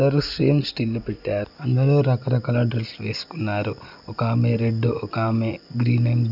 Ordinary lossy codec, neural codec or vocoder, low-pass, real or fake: none; none; 5.4 kHz; real